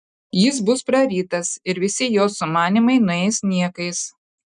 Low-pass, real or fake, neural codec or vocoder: 10.8 kHz; real; none